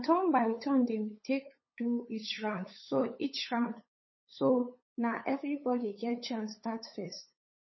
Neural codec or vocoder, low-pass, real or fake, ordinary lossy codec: codec, 16 kHz, 8 kbps, FunCodec, trained on LibriTTS, 25 frames a second; 7.2 kHz; fake; MP3, 24 kbps